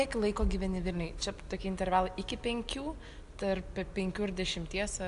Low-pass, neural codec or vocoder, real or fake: 10.8 kHz; none; real